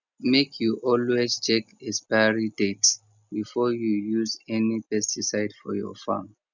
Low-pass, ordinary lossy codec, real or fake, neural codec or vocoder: 7.2 kHz; none; real; none